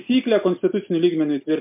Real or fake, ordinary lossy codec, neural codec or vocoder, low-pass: real; MP3, 32 kbps; none; 3.6 kHz